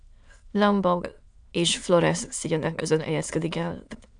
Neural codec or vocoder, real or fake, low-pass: autoencoder, 22.05 kHz, a latent of 192 numbers a frame, VITS, trained on many speakers; fake; 9.9 kHz